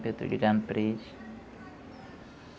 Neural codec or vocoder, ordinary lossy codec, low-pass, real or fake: none; none; none; real